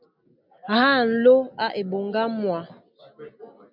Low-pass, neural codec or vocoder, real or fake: 5.4 kHz; none; real